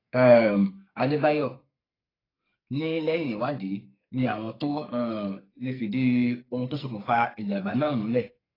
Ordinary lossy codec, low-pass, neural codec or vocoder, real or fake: AAC, 24 kbps; 5.4 kHz; codec, 44.1 kHz, 2.6 kbps, SNAC; fake